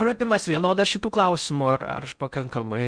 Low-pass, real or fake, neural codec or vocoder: 9.9 kHz; fake; codec, 16 kHz in and 24 kHz out, 0.6 kbps, FocalCodec, streaming, 4096 codes